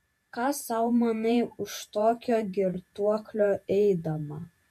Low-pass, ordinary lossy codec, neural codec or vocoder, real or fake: 14.4 kHz; MP3, 64 kbps; vocoder, 48 kHz, 128 mel bands, Vocos; fake